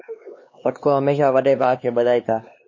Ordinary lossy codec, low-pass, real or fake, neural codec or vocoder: MP3, 32 kbps; 7.2 kHz; fake; codec, 16 kHz, 2 kbps, X-Codec, HuBERT features, trained on LibriSpeech